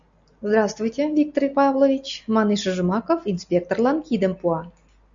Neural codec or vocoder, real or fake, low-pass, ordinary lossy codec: none; real; 7.2 kHz; Opus, 64 kbps